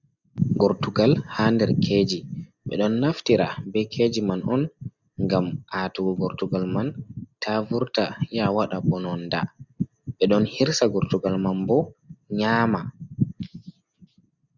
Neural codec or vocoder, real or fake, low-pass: none; real; 7.2 kHz